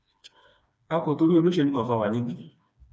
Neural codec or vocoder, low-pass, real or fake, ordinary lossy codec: codec, 16 kHz, 2 kbps, FreqCodec, smaller model; none; fake; none